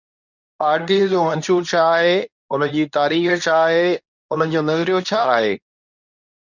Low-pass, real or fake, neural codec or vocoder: 7.2 kHz; fake; codec, 24 kHz, 0.9 kbps, WavTokenizer, medium speech release version 2